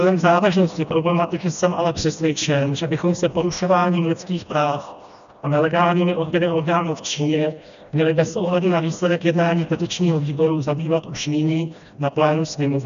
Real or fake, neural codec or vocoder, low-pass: fake; codec, 16 kHz, 1 kbps, FreqCodec, smaller model; 7.2 kHz